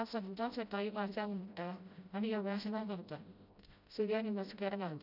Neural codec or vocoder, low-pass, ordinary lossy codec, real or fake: codec, 16 kHz, 0.5 kbps, FreqCodec, smaller model; 5.4 kHz; none; fake